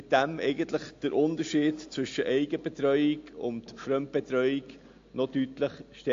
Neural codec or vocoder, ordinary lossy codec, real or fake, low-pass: none; AAC, 48 kbps; real; 7.2 kHz